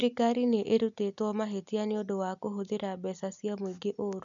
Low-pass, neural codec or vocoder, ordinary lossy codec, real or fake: 7.2 kHz; none; none; real